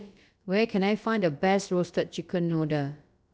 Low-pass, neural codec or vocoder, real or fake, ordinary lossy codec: none; codec, 16 kHz, about 1 kbps, DyCAST, with the encoder's durations; fake; none